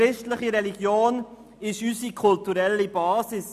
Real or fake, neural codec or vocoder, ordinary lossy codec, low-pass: real; none; none; 14.4 kHz